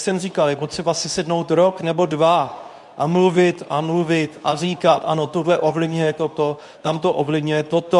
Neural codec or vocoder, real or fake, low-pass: codec, 24 kHz, 0.9 kbps, WavTokenizer, medium speech release version 2; fake; 10.8 kHz